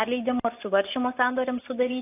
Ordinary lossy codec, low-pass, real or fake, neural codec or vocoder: AAC, 24 kbps; 3.6 kHz; real; none